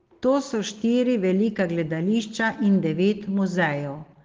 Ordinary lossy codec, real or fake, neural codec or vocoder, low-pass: Opus, 16 kbps; real; none; 7.2 kHz